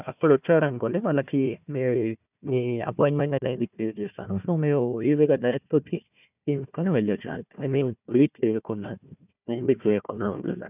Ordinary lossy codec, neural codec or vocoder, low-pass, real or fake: none; codec, 16 kHz, 1 kbps, FunCodec, trained on Chinese and English, 50 frames a second; 3.6 kHz; fake